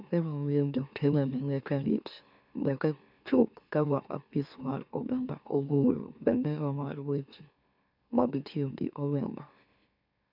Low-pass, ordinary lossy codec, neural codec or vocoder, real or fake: 5.4 kHz; none; autoencoder, 44.1 kHz, a latent of 192 numbers a frame, MeloTTS; fake